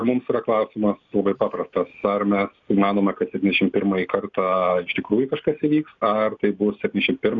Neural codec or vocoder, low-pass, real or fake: none; 7.2 kHz; real